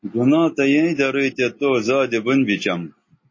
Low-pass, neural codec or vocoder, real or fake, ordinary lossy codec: 7.2 kHz; none; real; MP3, 32 kbps